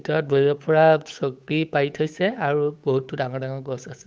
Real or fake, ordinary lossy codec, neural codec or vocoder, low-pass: fake; none; codec, 16 kHz, 8 kbps, FunCodec, trained on Chinese and English, 25 frames a second; none